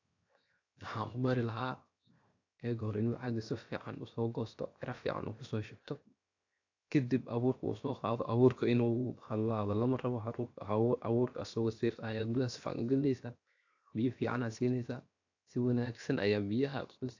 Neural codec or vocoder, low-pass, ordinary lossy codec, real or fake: codec, 16 kHz, 0.7 kbps, FocalCodec; 7.2 kHz; AAC, 48 kbps; fake